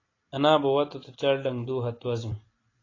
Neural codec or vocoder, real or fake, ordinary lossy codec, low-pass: vocoder, 44.1 kHz, 128 mel bands every 256 samples, BigVGAN v2; fake; AAC, 32 kbps; 7.2 kHz